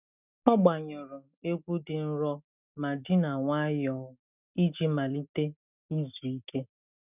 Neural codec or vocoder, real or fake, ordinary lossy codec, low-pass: none; real; none; 3.6 kHz